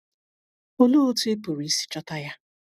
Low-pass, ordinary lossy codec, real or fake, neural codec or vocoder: 14.4 kHz; none; real; none